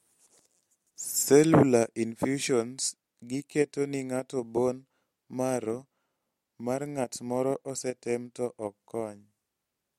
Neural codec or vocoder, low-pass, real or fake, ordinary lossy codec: none; 19.8 kHz; real; MP3, 64 kbps